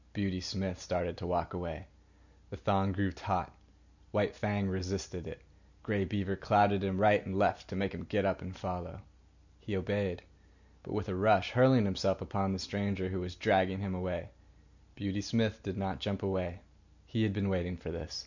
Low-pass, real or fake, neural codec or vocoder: 7.2 kHz; real; none